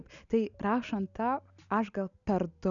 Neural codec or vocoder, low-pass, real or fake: none; 7.2 kHz; real